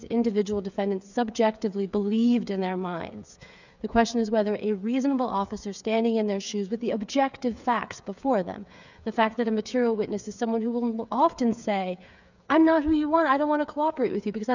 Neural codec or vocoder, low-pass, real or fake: codec, 16 kHz, 8 kbps, FreqCodec, smaller model; 7.2 kHz; fake